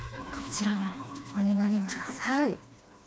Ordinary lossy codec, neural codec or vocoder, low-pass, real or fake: none; codec, 16 kHz, 2 kbps, FreqCodec, smaller model; none; fake